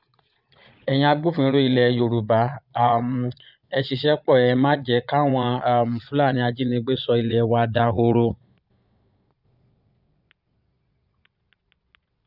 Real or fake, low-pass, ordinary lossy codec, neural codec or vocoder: fake; 5.4 kHz; none; vocoder, 22.05 kHz, 80 mel bands, Vocos